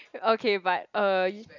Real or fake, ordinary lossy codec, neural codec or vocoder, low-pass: real; none; none; 7.2 kHz